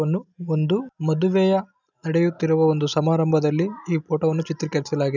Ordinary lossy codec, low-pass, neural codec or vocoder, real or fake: none; 7.2 kHz; none; real